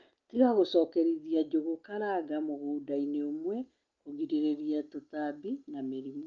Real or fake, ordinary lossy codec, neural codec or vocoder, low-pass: real; Opus, 24 kbps; none; 7.2 kHz